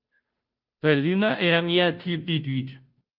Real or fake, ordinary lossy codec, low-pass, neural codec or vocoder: fake; Opus, 24 kbps; 5.4 kHz; codec, 16 kHz, 0.5 kbps, FunCodec, trained on Chinese and English, 25 frames a second